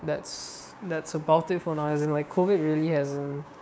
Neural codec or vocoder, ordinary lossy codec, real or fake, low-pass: codec, 16 kHz, 6 kbps, DAC; none; fake; none